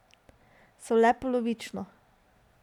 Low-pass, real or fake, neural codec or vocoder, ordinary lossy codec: 19.8 kHz; real; none; none